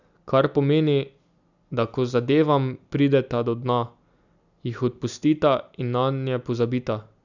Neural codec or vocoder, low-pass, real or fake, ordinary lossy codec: none; 7.2 kHz; real; none